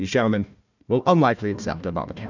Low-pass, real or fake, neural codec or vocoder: 7.2 kHz; fake; codec, 16 kHz, 1 kbps, FunCodec, trained on Chinese and English, 50 frames a second